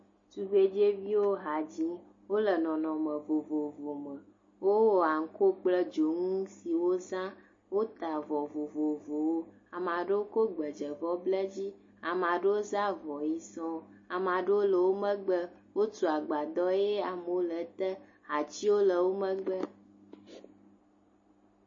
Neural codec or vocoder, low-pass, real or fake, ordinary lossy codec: none; 7.2 kHz; real; MP3, 32 kbps